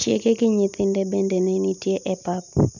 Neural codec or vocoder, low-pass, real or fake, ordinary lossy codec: none; 7.2 kHz; real; none